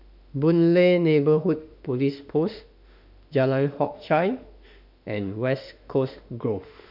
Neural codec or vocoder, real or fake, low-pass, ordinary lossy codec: autoencoder, 48 kHz, 32 numbers a frame, DAC-VAE, trained on Japanese speech; fake; 5.4 kHz; none